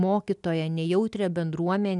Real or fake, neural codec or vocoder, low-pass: real; none; 10.8 kHz